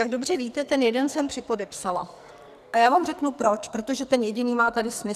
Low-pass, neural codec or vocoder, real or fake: 14.4 kHz; codec, 44.1 kHz, 2.6 kbps, SNAC; fake